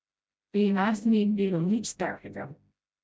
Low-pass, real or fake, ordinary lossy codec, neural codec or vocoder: none; fake; none; codec, 16 kHz, 0.5 kbps, FreqCodec, smaller model